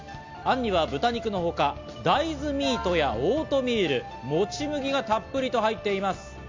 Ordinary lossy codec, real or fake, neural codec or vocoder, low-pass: MP3, 64 kbps; real; none; 7.2 kHz